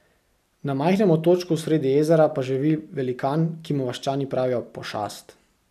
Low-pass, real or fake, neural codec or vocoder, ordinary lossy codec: 14.4 kHz; real; none; none